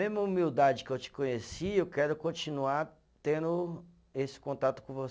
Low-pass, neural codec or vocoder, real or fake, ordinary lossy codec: none; none; real; none